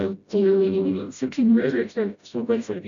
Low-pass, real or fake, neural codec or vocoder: 7.2 kHz; fake; codec, 16 kHz, 0.5 kbps, FreqCodec, smaller model